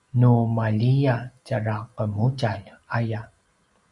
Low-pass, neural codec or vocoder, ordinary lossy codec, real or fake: 10.8 kHz; none; Opus, 64 kbps; real